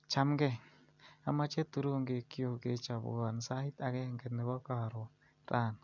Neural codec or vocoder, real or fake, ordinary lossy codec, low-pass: none; real; none; 7.2 kHz